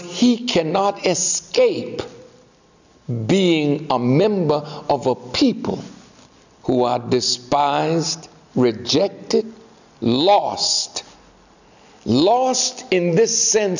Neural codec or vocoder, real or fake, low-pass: none; real; 7.2 kHz